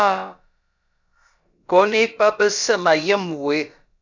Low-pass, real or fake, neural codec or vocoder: 7.2 kHz; fake; codec, 16 kHz, about 1 kbps, DyCAST, with the encoder's durations